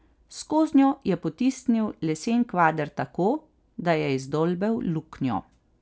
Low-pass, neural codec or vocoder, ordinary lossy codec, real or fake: none; none; none; real